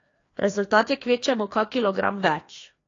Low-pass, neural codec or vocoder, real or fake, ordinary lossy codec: 7.2 kHz; codec, 16 kHz, 2 kbps, FreqCodec, larger model; fake; AAC, 32 kbps